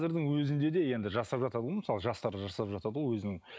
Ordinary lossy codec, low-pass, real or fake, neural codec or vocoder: none; none; real; none